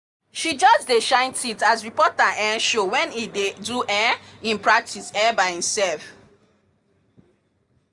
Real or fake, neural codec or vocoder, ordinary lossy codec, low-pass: real; none; none; 10.8 kHz